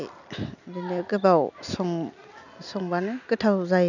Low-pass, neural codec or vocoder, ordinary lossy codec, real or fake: 7.2 kHz; none; none; real